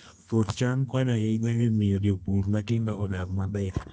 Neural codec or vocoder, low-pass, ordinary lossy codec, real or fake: codec, 24 kHz, 0.9 kbps, WavTokenizer, medium music audio release; 10.8 kHz; none; fake